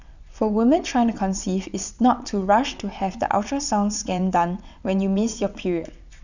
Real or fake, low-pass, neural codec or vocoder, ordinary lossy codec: fake; 7.2 kHz; vocoder, 22.05 kHz, 80 mel bands, WaveNeXt; none